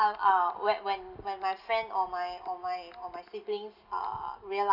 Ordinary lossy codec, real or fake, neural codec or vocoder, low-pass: none; real; none; 5.4 kHz